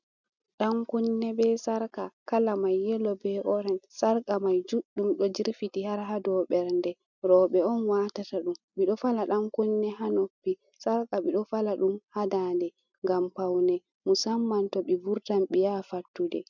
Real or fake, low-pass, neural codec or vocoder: real; 7.2 kHz; none